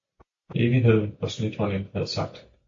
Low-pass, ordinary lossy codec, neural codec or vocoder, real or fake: 7.2 kHz; AAC, 48 kbps; none; real